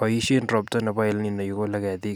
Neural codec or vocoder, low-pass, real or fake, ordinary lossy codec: vocoder, 44.1 kHz, 128 mel bands every 256 samples, BigVGAN v2; none; fake; none